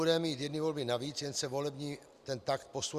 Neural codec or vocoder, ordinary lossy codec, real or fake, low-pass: none; Opus, 64 kbps; real; 14.4 kHz